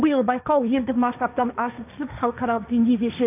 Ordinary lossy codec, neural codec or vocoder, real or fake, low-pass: none; codec, 16 kHz, 1.1 kbps, Voila-Tokenizer; fake; 3.6 kHz